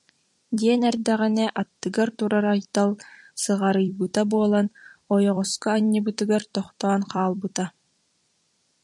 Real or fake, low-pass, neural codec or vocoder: fake; 10.8 kHz; vocoder, 44.1 kHz, 128 mel bands every 256 samples, BigVGAN v2